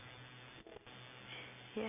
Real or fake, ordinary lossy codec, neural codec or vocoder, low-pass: real; none; none; 3.6 kHz